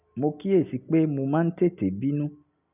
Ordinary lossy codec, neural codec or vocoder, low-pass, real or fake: none; none; 3.6 kHz; real